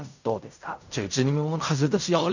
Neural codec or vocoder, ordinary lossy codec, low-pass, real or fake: codec, 16 kHz in and 24 kHz out, 0.4 kbps, LongCat-Audio-Codec, fine tuned four codebook decoder; none; 7.2 kHz; fake